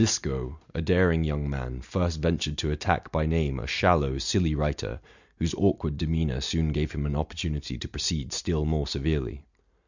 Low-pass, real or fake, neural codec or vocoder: 7.2 kHz; real; none